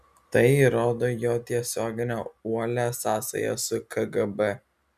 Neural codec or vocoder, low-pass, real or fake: none; 14.4 kHz; real